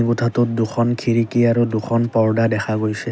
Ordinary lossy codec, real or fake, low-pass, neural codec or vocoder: none; real; none; none